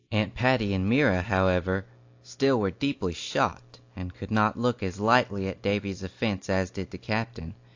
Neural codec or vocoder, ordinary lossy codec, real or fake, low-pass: none; AAC, 48 kbps; real; 7.2 kHz